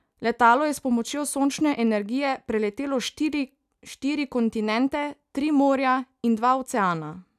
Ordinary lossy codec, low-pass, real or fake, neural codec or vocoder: none; 14.4 kHz; real; none